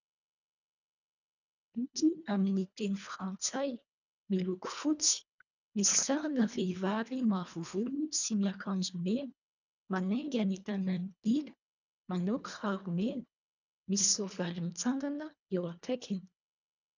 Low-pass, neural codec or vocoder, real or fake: 7.2 kHz; codec, 24 kHz, 1.5 kbps, HILCodec; fake